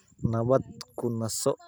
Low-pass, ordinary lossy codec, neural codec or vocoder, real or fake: none; none; none; real